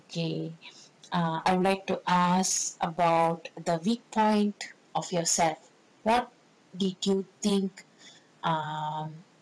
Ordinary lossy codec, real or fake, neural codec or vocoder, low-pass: none; fake; vocoder, 22.05 kHz, 80 mel bands, WaveNeXt; none